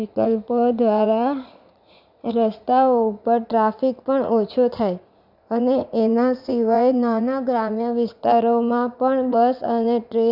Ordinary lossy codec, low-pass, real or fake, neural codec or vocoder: none; 5.4 kHz; fake; vocoder, 44.1 kHz, 80 mel bands, Vocos